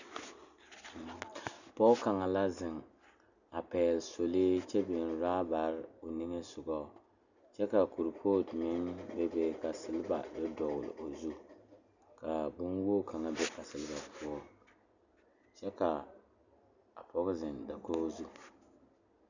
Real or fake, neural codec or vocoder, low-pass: real; none; 7.2 kHz